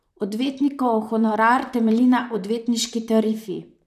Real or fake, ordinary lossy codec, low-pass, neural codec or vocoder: fake; none; 14.4 kHz; vocoder, 44.1 kHz, 128 mel bands, Pupu-Vocoder